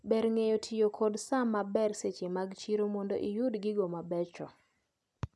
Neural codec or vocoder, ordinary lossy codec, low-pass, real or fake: none; none; none; real